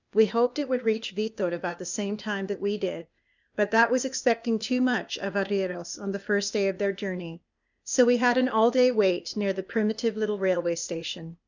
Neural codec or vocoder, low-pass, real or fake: codec, 16 kHz, 0.8 kbps, ZipCodec; 7.2 kHz; fake